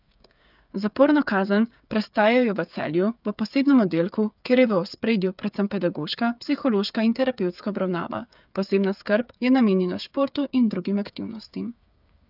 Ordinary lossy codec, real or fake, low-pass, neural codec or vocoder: none; fake; 5.4 kHz; codec, 16 kHz, 8 kbps, FreqCodec, smaller model